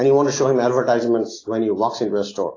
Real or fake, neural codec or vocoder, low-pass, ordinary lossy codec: real; none; 7.2 kHz; AAC, 32 kbps